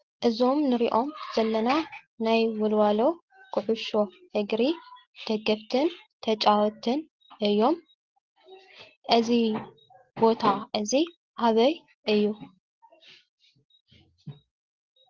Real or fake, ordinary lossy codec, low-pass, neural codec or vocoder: real; Opus, 16 kbps; 7.2 kHz; none